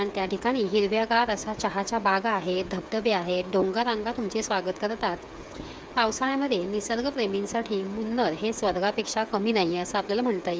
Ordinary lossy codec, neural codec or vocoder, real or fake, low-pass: none; codec, 16 kHz, 8 kbps, FreqCodec, smaller model; fake; none